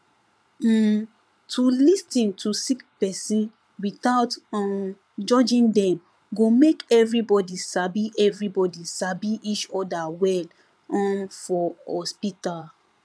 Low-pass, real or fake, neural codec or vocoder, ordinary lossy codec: none; fake; vocoder, 22.05 kHz, 80 mel bands, Vocos; none